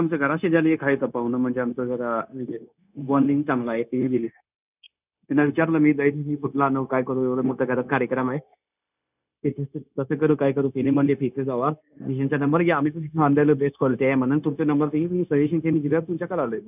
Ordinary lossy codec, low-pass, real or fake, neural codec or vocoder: none; 3.6 kHz; fake; codec, 16 kHz, 0.9 kbps, LongCat-Audio-Codec